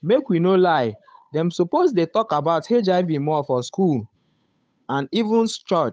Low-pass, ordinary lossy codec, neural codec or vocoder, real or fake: none; none; codec, 16 kHz, 8 kbps, FunCodec, trained on Chinese and English, 25 frames a second; fake